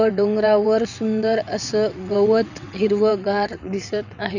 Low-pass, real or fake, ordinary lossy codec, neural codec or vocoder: 7.2 kHz; fake; none; vocoder, 22.05 kHz, 80 mel bands, WaveNeXt